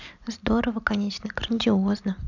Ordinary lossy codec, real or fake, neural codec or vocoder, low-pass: none; real; none; 7.2 kHz